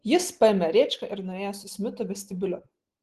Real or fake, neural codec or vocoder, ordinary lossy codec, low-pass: real; none; Opus, 16 kbps; 14.4 kHz